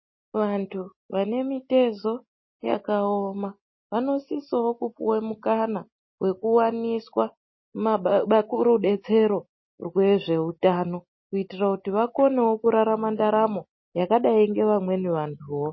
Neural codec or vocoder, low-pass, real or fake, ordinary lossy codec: none; 7.2 kHz; real; MP3, 24 kbps